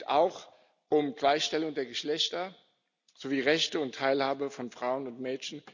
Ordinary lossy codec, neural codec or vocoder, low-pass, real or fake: none; none; 7.2 kHz; real